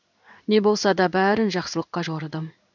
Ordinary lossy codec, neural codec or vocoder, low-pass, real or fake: none; codec, 16 kHz in and 24 kHz out, 1 kbps, XY-Tokenizer; 7.2 kHz; fake